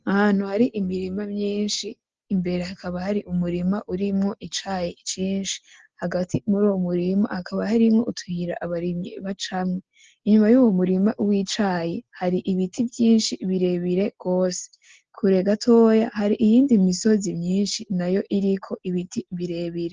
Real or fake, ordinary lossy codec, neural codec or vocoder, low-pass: real; Opus, 16 kbps; none; 7.2 kHz